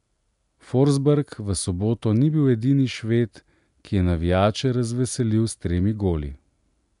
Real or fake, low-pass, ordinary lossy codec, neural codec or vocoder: real; 10.8 kHz; none; none